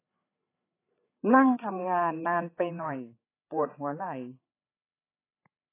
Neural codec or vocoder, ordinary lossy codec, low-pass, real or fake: codec, 16 kHz, 8 kbps, FreqCodec, larger model; AAC, 24 kbps; 3.6 kHz; fake